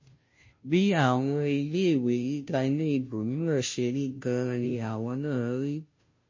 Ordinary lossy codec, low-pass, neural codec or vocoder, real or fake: MP3, 32 kbps; 7.2 kHz; codec, 16 kHz, 0.5 kbps, FunCodec, trained on Chinese and English, 25 frames a second; fake